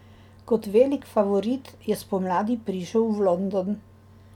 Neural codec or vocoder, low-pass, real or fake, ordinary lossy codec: none; 19.8 kHz; real; none